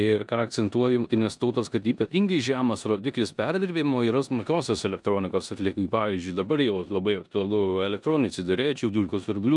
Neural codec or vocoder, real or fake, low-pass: codec, 16 kHz in and 24 kHz out, 0.9 kbps, LongCat-Audio-Codec, four codebook decoder; fake; 10.8 kHz